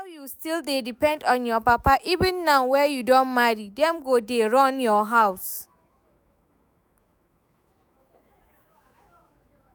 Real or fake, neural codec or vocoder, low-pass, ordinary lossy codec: fake; autoencoder, 48 kHz, 128 numbers a frame, DAC-VAE, trained on Japanese speech; none; none